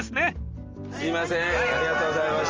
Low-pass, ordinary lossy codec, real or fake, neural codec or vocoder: 7.2 kHz; Opus, 24 kbps; real; none